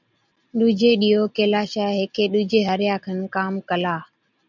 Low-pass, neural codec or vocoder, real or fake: 7.2 kHz; none; real